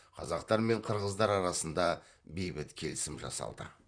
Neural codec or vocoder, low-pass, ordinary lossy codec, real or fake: vocoder, 44.1 kHz, 128 mel bands, Pupu-Vocoder; 9.9 kHz; none; fake